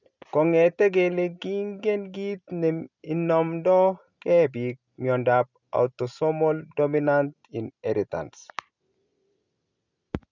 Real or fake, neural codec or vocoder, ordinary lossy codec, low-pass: real; none; none; 7.2 kHz